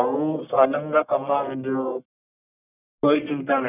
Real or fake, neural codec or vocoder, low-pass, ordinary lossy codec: fake; codec, 44.1 kHz, 1.7 kbps, Pupu-Codec; 3.6 kHz; none